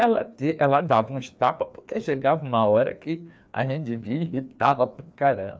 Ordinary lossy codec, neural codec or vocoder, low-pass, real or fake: none; codec, 16 kHz, 2 kbps, FreqCodec, larger model; none; fake